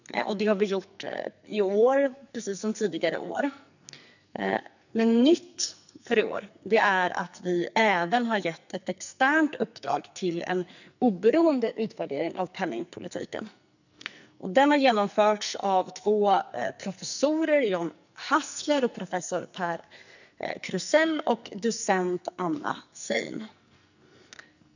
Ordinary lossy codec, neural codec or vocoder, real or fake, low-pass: none; codec, 44.1 kHz, 2.6 kbps, SNAC; fake; 7.2 kHz